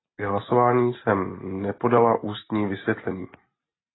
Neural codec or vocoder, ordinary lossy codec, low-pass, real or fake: none; AAC, 16 kbps; 7.2 kHz; real